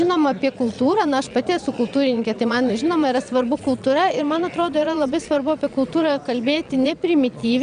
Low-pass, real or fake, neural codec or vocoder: 9.9 kHz; fake; vocoder, 22.05 kHz, 80 mel bands, Vocos